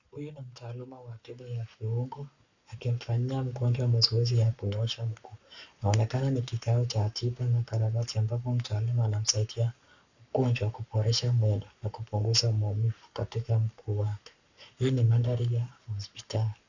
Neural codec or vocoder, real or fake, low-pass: codec, 44.1 kHz, 7.8 kbps, Pupu-Codec; fake; 7.2 kHz